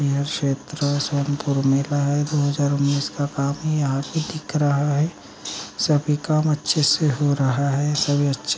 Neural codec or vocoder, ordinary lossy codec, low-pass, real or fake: none; none; none; real